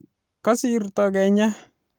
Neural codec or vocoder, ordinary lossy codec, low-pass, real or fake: codec, 44.1 kHz, 7.8 kbps, Pupu-Codec; Opus, 32 kbps; 19.8 kHz; fake